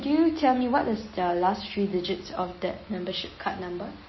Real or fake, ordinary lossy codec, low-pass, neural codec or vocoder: real; MP3, 24 kbps; 7.2 kHz; none